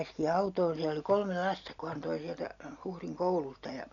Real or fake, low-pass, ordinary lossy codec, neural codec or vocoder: real; 7.2 kHz; none; none